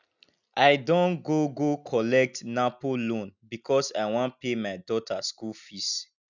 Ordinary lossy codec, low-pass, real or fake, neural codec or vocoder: none; 7.2 kHz; real; none